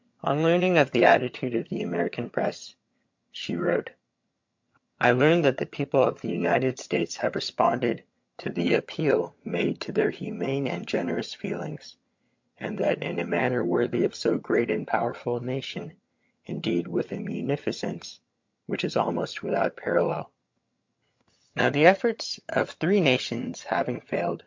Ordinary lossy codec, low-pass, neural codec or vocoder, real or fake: MP3, 48 kbps; 7.2 kHz; vocoder, 22.05 kHz, 80 mel bands, HiFi-GAN; fake